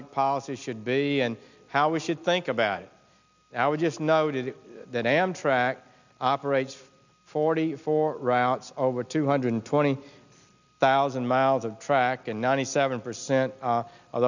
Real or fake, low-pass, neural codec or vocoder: real; 7.2 kHz; none